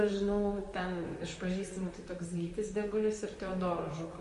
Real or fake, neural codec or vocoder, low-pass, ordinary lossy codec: fake; vocoder, 44.1 kHz, 128 mel bands, Pupu-Vocoder; 14.4 kHz; MP3, 48 kbps